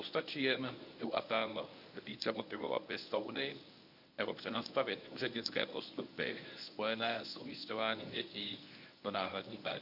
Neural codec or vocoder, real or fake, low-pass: codec, 24 kHz, 0.9 kbps, WavTokenizer, medium speech release version 1; fake; 5.4 kHz